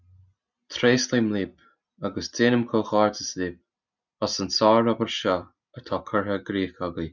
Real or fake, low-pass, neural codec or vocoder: real; 7.2 kHz; none